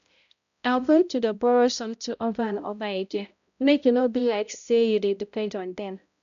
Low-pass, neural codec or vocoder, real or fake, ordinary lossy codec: 7.2 kHz; codec, 16 kHz, 0.5 kbps, X-Codec, HuBERT features, trained on balanced general audio; fake; none